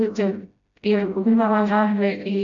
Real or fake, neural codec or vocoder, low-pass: fake; codec, 16 kHz, 0.5 kbps, FreqCodec, smaller model; 7.2 kHz